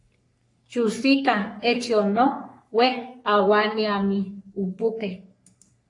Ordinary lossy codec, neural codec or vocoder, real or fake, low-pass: AAC, 48 kbps; codec, 44.1 kHz, 3.4 kbps, Pupu-Codec; fake; 10.8 kHz